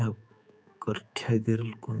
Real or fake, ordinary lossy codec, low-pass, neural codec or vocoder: fake; none; none; codec, 16 kHz, 4 kbps, X-Codec, HuBERT features, trained on general audio